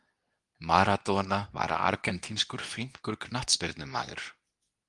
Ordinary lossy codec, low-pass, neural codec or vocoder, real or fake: Opus, 32 kbps; 10.8 kHz; codec, 24 kHz, 0.9 kbps, WavTokenizer, medium speech release version 2; fake